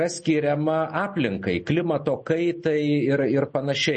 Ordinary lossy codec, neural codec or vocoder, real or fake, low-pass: MP3, 32 kbps; none; real; 10.8 kHz